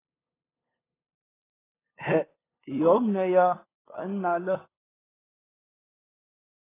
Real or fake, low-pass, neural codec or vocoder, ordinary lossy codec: fake; 3.6 kHz; codec, 16 kHz, 8 kbps, FunCodec, trained on LibriTTS, 25 frames a second; AAC, 16 kbps